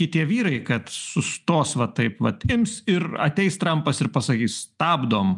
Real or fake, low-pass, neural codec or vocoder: real; 10.8 kHz; none